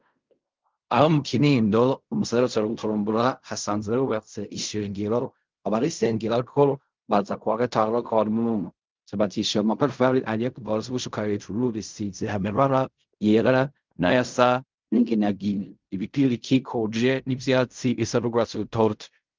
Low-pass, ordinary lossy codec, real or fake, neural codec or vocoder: 7.2 kHz; Opus, 24 kbps; fake; codec, 16 kHz in and 24 kHz out, 0.4 kbps, LongCat-Audio-Codec, fine tuned four codebook decoder